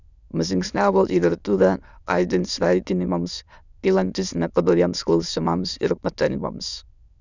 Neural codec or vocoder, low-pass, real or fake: autoencoder, 22.05 kHz, a latent of 192 numbers a frame, VITS, trained on many speakers; 7.2 kHz; fake